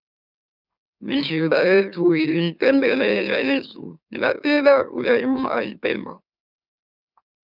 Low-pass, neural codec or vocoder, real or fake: 5.4 kHz; autoencoder, 44.1 kHz, a latent of 192 numbers a frame, MeloTTS; fake